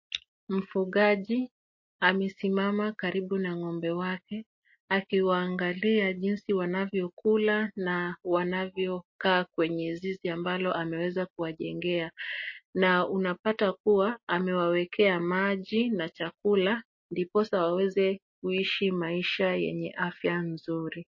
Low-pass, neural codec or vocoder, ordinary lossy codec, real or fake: 7.2 kHz; none; MP3, 32 kbps; real